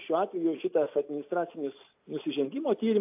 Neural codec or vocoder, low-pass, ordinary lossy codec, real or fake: none; 3.6 kHz; AAC, 32 kbps; real